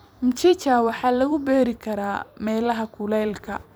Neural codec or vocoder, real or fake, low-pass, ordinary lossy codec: vocoder, 44.1 kHz, 128 mel bands every 256 samples, BigVGAN v2; fake; none; none